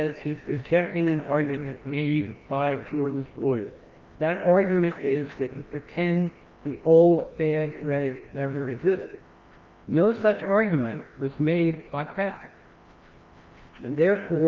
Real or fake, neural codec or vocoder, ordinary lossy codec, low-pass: fake; codec, 16 kHz, 1 kbps, FreqCodec, larger model; Opus, 32 kbps; 7.2 kHz